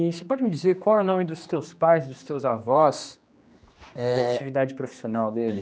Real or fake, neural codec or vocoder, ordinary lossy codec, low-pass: fake; codec, 16 kHz, 2 kbps, X-Codec, HuBERT features, trained on general audio; none; none